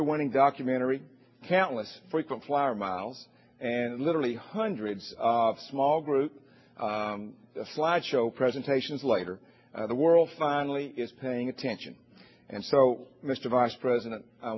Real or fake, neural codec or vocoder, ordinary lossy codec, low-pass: real; none; MP3, 24 kbps; 7.2 kHz